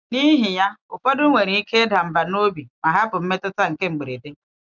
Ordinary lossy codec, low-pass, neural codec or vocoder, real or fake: none; 7.2 kHz; none; real